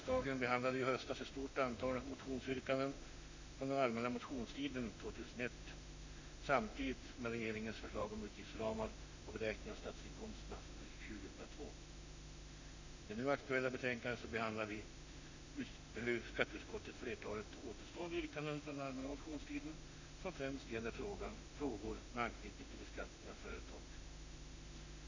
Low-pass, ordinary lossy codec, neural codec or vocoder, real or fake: 7.2 kHz; AAC, 48 kbps; autoencoder, 48 kHz, 32 numbers a frame, DAC-VAE, trained on Japanese speech; fake